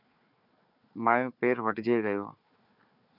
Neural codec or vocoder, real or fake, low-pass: codec, 16 kHz, 4 kbps, FunCodec, trained on Chinese and English, 50 frames a second; fake; 5.4 kHz